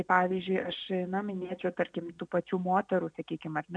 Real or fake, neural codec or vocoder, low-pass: real; none; 9.9 kHz